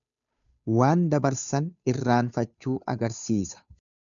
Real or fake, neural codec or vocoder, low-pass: fake; codec, 16 kHz, 2 kbps, FunCodec, trained on Chinese and English, 25 frames a second; 7.2 kHz